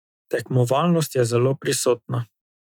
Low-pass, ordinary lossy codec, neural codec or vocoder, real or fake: 19.8 kHz; none; none; real